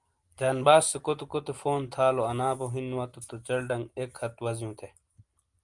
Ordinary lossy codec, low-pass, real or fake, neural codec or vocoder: Opus, 32 kbps; 10.8 kHz; real; none